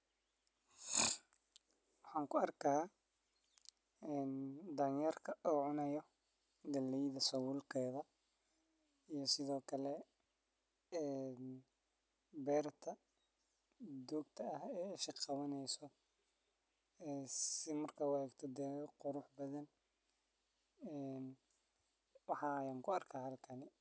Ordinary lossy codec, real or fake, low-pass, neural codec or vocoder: none; real; none; none